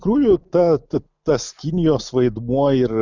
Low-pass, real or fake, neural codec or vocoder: 7.2 kHz; real; none